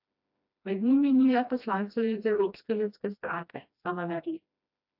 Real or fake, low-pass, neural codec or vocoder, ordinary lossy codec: fake; 5.4 kHz; codec, 16 kHz, 1 kbps, FreqCodec, smaller model; AAC, 48 kbps